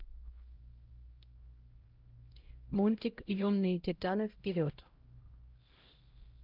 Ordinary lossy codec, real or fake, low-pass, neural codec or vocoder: Opus, 32 kbps; fake; 5.4 kHz; codec, 16 kHz, 0.5 kbps, X-Codec, HuBERT features, trained on LibriSpeech